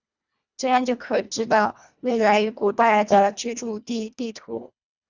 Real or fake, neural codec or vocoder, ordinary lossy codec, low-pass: fake; codec, 24 kHz, 1.5 kbps, HILCodec; Opus, 64 kbps; 7.2 kHz